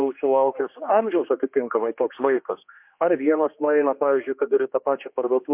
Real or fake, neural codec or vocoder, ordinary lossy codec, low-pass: fake; codec, 16 kHz, 2 kbps, X-Codec, HuBERT features, trained on general audio; MP3, 32 kbps; 3.6 kHz